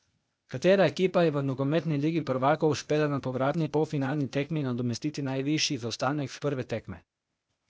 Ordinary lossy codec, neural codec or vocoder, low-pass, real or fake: none; codec, 16 kHz, 0.8 kbps, ZipCodec; none; fake